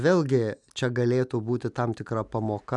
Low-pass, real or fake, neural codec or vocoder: 10.8 kHz; real; none